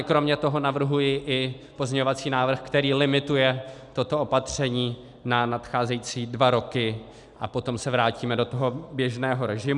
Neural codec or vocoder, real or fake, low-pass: none; real; 10.8 kHz